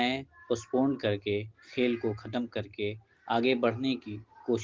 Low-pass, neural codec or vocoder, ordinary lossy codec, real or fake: 7.2 kHz; none; Opus, 16 kbps; real